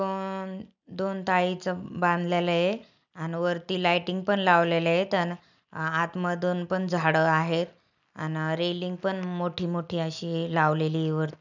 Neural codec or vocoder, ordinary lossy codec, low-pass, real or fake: none; none; 7.2 kHz; real